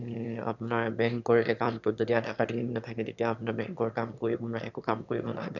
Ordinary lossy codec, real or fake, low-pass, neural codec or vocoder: none; fake; 7.2 kHz; autoencoder, 22.05 kHz, a latent of 192 numbers a frame, VITS, trained on one speaker